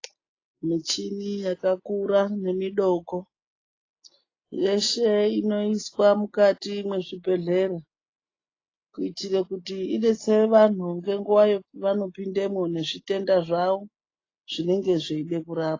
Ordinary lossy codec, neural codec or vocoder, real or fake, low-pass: AAC, 32 kbps; none; real; 7.2 kHz